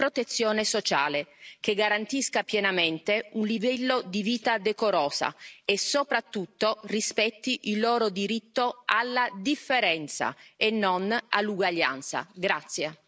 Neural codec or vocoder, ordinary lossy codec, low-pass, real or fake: none; none; none; real